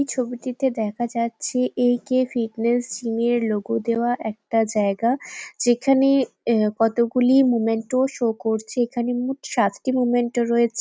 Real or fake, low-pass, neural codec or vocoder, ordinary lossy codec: real; none; none; none